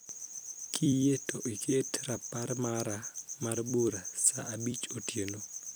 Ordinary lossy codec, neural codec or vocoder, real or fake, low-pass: none; vocoder, 44.1 kHz, 128 mel bands every 256 samples, BigVGAN v2; fake; none